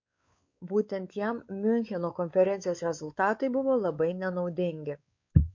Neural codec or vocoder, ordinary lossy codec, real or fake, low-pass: codec, 16 kHz, 4 kbps, X-Codec, WavLM features, trained on Multilingual LibriSpeech; MP3, 48 kbps; fake; 7.2 kHz